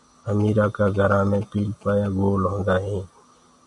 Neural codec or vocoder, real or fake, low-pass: none; real; 10.8 kHz